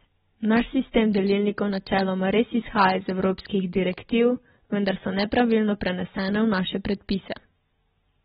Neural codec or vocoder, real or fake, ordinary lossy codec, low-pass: none; real; AAC, 16 kbps; 9.9 kHz